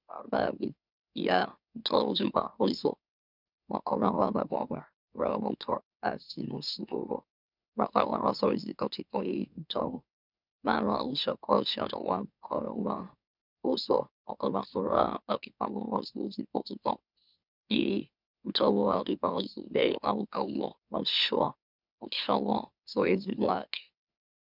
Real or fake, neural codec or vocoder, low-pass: fake; autoencoder, 44.1 kHz, a latent of 192 numbers a frame, MeloTTS; 5.4 kHz